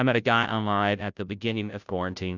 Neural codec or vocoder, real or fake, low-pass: codec, 16 kHz, 0.5 kbps, FunCodec, trained on Chinese and English, 25 frames a second; fake; 7.2 kHz